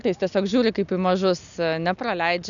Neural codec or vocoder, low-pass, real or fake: none; 7.2 kHz; real